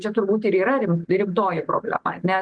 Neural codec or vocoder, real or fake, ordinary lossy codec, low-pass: none; real; Opus, 24 kbps; 9.9 kHz